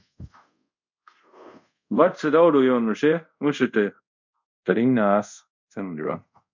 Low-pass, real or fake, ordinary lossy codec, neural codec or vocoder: 7.2 kHz; fake; MP3, 64 kbps; codec, 24 kHz, 0.5 kbps, DualCodec